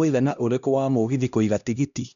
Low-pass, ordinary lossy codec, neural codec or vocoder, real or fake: 7.2 kHz; none; codec, 16 kHz, 1 kbps, X-Codec, HuBERT features, trained on LibriSpeech; fake